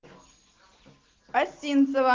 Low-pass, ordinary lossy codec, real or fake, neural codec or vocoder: 7.2 kHz; Opus, 24 kbps; real; none